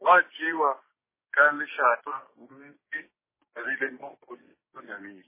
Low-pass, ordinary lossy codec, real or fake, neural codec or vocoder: 3.6 kHz; MP3, 16 kbps; fake; codec, 16 kHz, 6 kbps, DAC